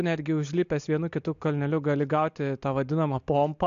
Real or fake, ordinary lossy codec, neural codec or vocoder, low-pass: real; AAC, 64 kbps; none; 7.2 kHz